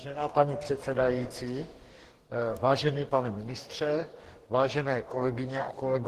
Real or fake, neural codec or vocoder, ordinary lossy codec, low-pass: fake; codec, 44.1 kHz, 2.6 kbps, DAC; Opus, 16 kbps; 14.4 kHz